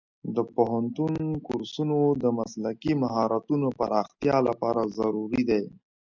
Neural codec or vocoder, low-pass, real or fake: none; 7.2 kHz; real